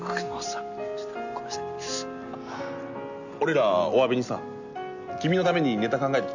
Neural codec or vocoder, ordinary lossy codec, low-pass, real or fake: none; none; 7.2 kHz; real